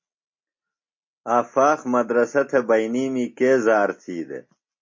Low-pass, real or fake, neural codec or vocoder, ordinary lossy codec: 7.2 kHz; real; none; MP3, 32 kbps